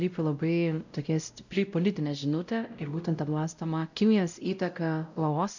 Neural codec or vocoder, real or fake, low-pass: codec, 16 kHz, 0.5 kbps, X-Codec, WavLM features, trained on Multilingual LibriSpeech; fake; 7.2 kHz